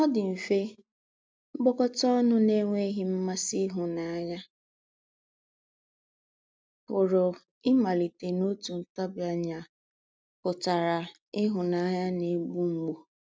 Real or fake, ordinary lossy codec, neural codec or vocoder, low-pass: real; none; none; none